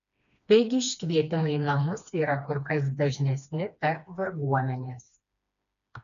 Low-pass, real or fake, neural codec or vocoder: 7.2 kHz; fake; codec, 16 kHz, 2 kbps, FreqCodec, smaller model